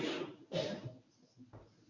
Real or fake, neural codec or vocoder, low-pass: fake; codec, 24 kHz, 0.9 kbps, WavTokenizer, medium speech release version 1; 7.2 kHz